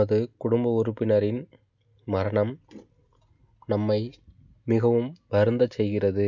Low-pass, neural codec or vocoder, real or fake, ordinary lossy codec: 7.2 kHz; none; real; none